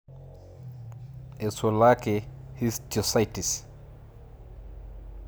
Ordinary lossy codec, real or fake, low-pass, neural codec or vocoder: none; real; none; none